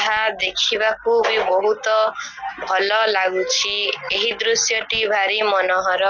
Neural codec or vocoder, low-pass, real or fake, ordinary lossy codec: none; 7.2 kHz; real; none